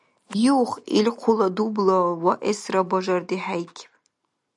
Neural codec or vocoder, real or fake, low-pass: none; real; 10.8 kHz